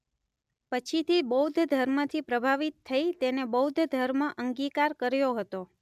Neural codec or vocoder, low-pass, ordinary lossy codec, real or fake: none; 14.4 kHz; none; real